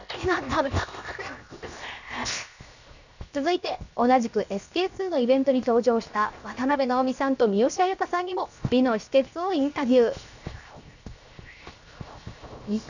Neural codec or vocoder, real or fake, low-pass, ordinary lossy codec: codec, 16 kHz, 0.7 kbps, FocalCodec; fake; 7.2 kHz; none